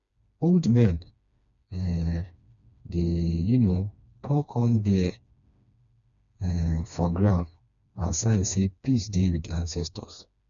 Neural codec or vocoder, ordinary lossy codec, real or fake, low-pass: codec, 16 kHz, 2 kbps, FreqCodec, smaller model; none; fake; 7.2 kHz